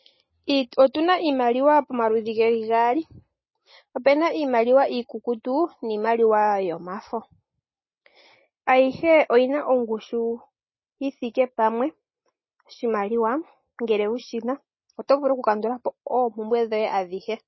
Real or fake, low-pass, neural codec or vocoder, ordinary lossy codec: real; 7.2 kHz; none; MP3, 24 kbps